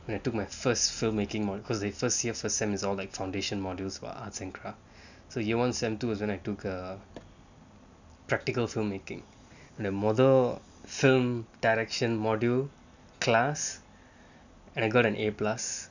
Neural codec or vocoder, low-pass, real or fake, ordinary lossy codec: none; 7.2 kHz; real; none